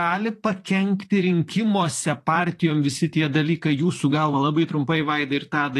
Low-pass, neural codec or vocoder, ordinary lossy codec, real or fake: 14.4 kHz; vocoder, 44.1 kHz, 128 mel bands, Pupu-Vocoder; AAC, 48 kbps; fake